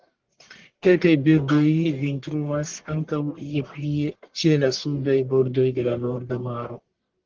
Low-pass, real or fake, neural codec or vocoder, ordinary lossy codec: 7.2 kHz; fake; codec, 44.1 kHz, 1.7 kbps, Pupu-Codec; Opus, 16 kbps